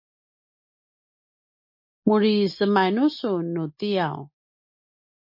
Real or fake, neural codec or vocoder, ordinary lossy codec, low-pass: real; none; MP3, 32 kbps; 5.4 kHz